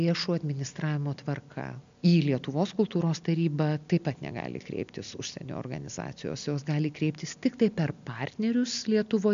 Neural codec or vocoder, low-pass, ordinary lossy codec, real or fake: none; 7.2 kHz; MP3, 64 kbps; real